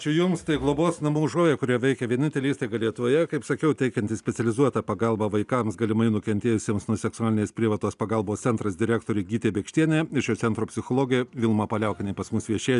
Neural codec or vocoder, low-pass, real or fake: none; 10.8 kHz; real